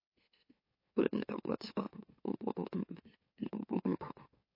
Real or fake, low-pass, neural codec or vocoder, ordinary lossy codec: fake; 5.4 kHz; autoencoder, 44.1 kHz, a latent of 192 numbers a frame, MeloTTS; MP3, 32 kbps